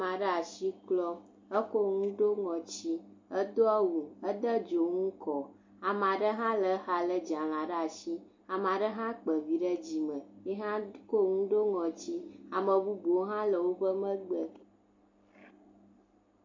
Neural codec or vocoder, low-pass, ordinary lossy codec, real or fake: none; 7.2 kHz; MP3, 48 kbps; real